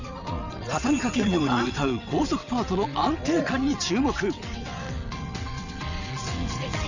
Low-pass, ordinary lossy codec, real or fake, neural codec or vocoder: 7.2 kHz; none; fake; vocoder, 22.05 kHz, 80 mel bands, WaveNeXt